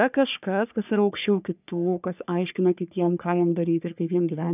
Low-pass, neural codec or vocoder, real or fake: 3.6 kHz; codec, 44.1 kHz, 3.4 kbps, Pupu-Codec; fake